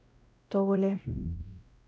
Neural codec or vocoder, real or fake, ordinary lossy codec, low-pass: codec, 16 kHz, 0.5 kbps, X-Codec, WavLM features, trained on Multilingual LibriSpeech; fake; none; none